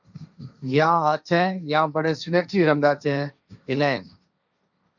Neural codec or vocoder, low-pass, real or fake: codec, 16 kHz, 1.1 kbps, Voila-Tokenizer; 7.2 kHz; fake